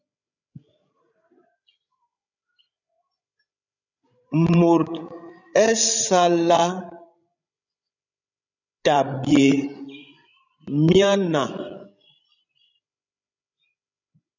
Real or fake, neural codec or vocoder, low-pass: fake; codec, 16 kHz, 16 kbps, FreqCodec, larger model; 7.2 kHz